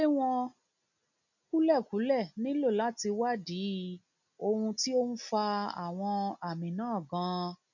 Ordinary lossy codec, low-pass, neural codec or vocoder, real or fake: none; 7.2 kHz; none; real